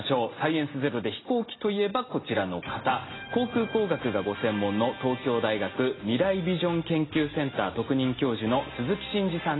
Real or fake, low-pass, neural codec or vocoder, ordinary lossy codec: real; 7.2 kHz; none; AAC, 16 kbps